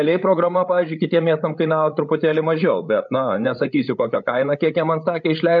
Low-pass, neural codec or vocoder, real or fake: 7.2 kHz; codec, 16 kHz, 8 kbps, FreqCodec, larger model; fake